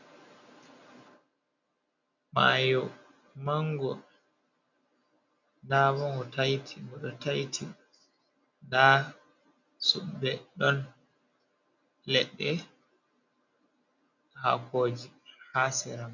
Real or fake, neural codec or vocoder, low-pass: real; none; 7.2 kHz